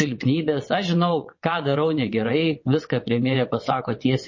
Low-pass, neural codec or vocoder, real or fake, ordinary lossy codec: 7.2 kHz; vocoder, 22.05 kHz, 80 mel bands, WaveNeXt; fake; MP3, 32 kbps